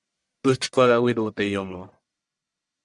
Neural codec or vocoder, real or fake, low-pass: codec, 44.1 kHz, 1.7 kbps, Pupu-Codec; fake; 10.8 kHz